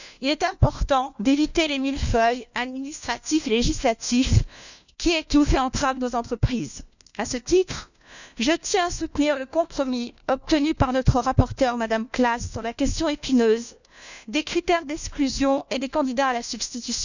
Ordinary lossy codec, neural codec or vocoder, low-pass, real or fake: none; codec, 16 kHz, 1 kbps, FunCodec, trained on LibriTTS, 50 frames a second; 7.2 kHz; fake